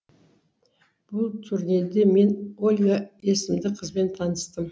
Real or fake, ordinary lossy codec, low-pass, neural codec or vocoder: real; none; none; none